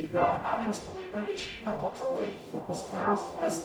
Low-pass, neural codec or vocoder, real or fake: 19.8 kHz; codec, 44.1 kHz, 0.9 kbps, DAC; fake